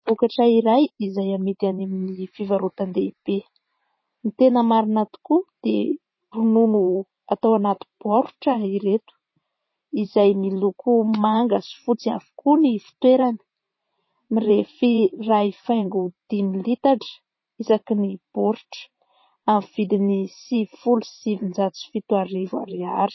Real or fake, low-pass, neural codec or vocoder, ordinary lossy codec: fake; 7.2 kHz; vocoder, 44.1 kHz, 128 mel bands every 512 samples, BigVGAN v2; MP3, 24 kbps